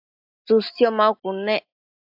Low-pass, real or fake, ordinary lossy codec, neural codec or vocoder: 5.4 kHz; real; AAC, 48 kbps; none